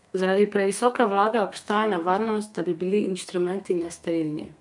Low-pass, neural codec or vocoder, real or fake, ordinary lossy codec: 10.8 kHz; codec, 32 kHz, 1.9 kbps, SNAC; fake; none